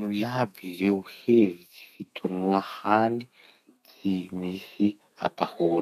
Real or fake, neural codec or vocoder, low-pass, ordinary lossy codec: fake; codec, 32 kHz, 1.9 kbps, SNAC; 14.4 kHz; none